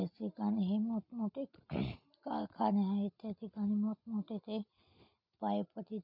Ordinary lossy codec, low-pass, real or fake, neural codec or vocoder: none; 5.4 kHz; real; none